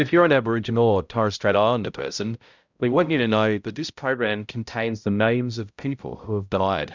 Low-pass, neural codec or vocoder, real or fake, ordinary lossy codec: 7.2 kHz; codec, 16 kHz, 0.5 kbps, X-Codec, HuBERT features, trained on balanced general audio; fake; Opus, 64 kbps